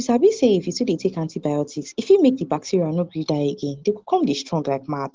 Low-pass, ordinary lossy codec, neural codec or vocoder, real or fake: 7.2 kHz; Opus, 16 kbps; none; real